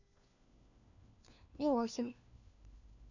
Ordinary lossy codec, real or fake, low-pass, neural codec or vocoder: none; fake; 7.2 kHz; codec, 16 kHz, 1 kbps, FunCodec, trained on LibriTTS, 50 frames a second